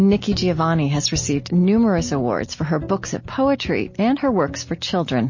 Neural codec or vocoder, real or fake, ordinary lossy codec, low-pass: none; real; MP3, 32 kbps; 7.2 kHz